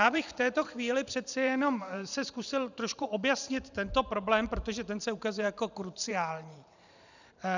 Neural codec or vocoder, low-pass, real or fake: vocoder, 44.1 kHz, 128 mel bands every 512 samples, BigVGAN v2; 7.2 kHz; fake